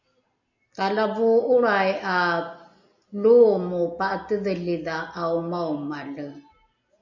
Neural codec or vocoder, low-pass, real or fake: none; 7.2 kHz; real